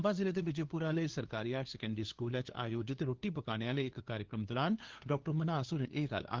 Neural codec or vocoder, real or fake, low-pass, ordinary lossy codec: codec, 16 kHz, 2 kbps, FunCodec, trained on LibriTTS, 25 frames a second; fake; 7.2 kHz; Opus, 16 kbps